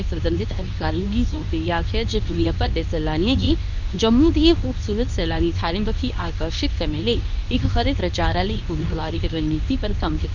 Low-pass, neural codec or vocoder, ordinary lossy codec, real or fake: 7.2 kHz; codec, 24 kHz, 0.9 kbps, WavTokenizer, medium speech release version 2; none; fake